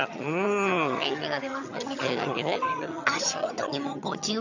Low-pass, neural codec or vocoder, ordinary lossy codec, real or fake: 7.2 kHz; vocoder, 22.05 kHz, 80 mel bands, HiFi-GAN; none; fake